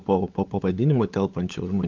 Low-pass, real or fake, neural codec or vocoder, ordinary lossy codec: 7.2 kHz; fake; codec, 16 kHz, 16 kbps, FunCodec, trained on LibriTTS, 50 frames a second; Opus, 24 kbps